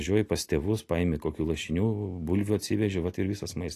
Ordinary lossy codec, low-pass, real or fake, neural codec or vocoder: AAC, 48 kbps; 14.4 kHz; real; none